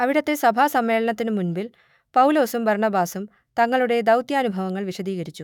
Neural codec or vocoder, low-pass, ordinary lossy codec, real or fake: autoencoder, 48 kHz, 128 numbers a frame, DAC-VAE, trained on Japanese speech; 19.8 kHz; none; fake